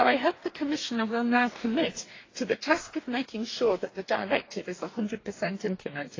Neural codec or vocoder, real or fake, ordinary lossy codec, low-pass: codec, 44.1 kHz, 2.6 kbps, DAC; fake; AAC, 32 kbps; 7.2 kHz